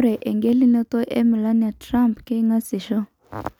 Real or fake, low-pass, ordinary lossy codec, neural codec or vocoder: real; 19.8 kHz; none; none